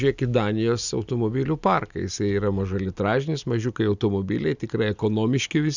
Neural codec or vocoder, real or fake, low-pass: none; real; 7.2 kHz